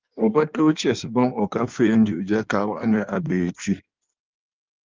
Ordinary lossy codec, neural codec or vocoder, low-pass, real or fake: Opus, 32 kbps; codec, 16 kHz in and 24 kHz out, 1.1 kbps, FireRedTTS-2 codec; 7.2 kHz; fake